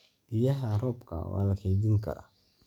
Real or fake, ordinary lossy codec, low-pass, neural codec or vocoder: fake; none; 19.8 kHz; codec, 44.1 kHz, 7.8 kbps, DAC